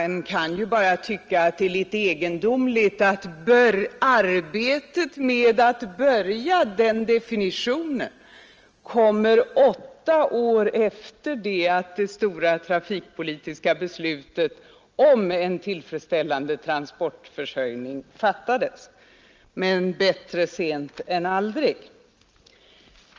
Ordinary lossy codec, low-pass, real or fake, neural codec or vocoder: Opus, 24 kbps; 7.2 kHz; real; none